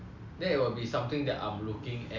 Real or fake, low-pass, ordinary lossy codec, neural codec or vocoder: real; 7.2 kHz; MP3, 64 kbps; none